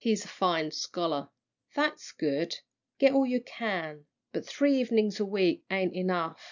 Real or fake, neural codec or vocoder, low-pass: real; none; 7.2 kHz